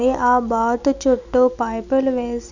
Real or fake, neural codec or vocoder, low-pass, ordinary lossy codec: real; none; 7.2 kHz; none